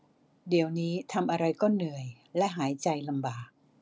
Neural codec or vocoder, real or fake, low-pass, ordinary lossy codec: none; real; none; none